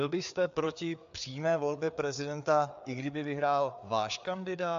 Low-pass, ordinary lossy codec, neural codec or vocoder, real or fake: 7.2 kHz; AAC, 64 kbps; codec, 16 kHz, 4 kbps, FreqCodec, larger model; fake